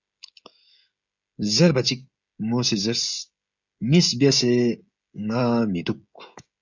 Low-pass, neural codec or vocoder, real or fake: 7.2 kHz; codec, 16 kHz, 16 kbps, FreqCodec, smaller model; fake